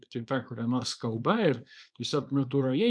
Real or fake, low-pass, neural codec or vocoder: fake; 9.9 kHz; codec, 24 kHz, 0.9 kbps, WavTokenizer, small release